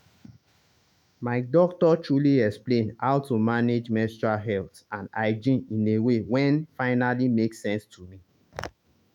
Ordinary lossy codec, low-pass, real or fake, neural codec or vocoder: none; 19.8 kHz; fake; autoencoder, 48 kHz, 128 numbers a frame, DAC-VAE, trained on Japanese speech